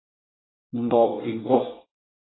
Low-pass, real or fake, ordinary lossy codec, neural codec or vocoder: 7.2 kHz; fake; AAC, 16 kbps; codec, 24 kHz, 1 kbps, SNAC